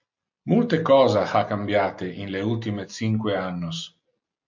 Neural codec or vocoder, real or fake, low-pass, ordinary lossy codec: none; real; 7.2 kHz; MP3, 48 kbps